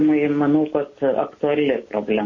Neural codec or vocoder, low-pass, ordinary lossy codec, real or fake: none; 7.2 kHz; MP3, 32 kbps; real